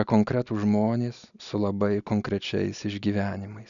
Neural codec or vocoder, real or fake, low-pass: none; real; 7.2 kHz